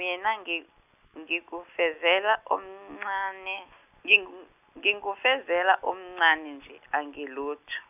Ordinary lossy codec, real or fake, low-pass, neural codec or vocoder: none; real; 3.6 kHz; none